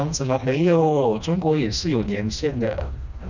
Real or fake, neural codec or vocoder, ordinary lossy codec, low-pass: fake; codec, 16 kHz, 1 kbps, FreqCodec, smaller model; none; 7.2 kHz